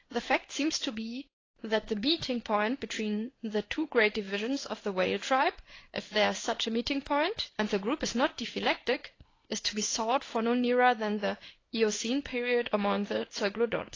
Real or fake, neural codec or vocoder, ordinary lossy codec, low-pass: fake; codec, 16 kHz in and 24 kHz out, 1 kbps, XY-Tokenizer; AAC, 32 kbps; 7.2 kHz